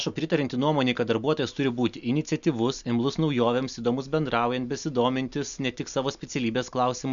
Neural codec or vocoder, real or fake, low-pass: none; real; 7.2 kHz